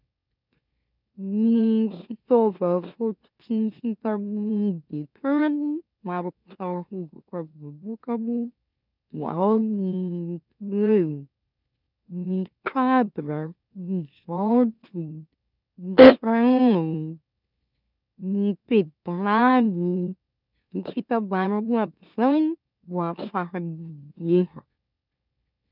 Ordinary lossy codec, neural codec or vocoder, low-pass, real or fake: none; autoencoder, 44.1 kHz, a latent of 192 numbers a frame, MeloTTS; 5.4 kHz; fake